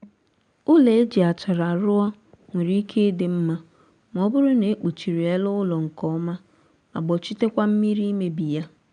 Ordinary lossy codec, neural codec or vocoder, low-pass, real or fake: none; none; 9.9 kHz; real